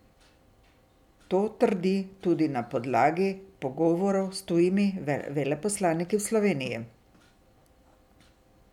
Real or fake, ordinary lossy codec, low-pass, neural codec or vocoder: real; none; 19.8 kHz; none